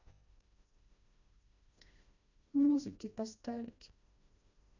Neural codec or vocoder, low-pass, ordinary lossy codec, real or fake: codec, 16 kHz, 1 kbps, FreqCodec, smaller model; 7.2 kHz; none; fake